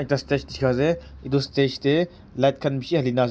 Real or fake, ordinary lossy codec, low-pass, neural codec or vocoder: real; none; none; none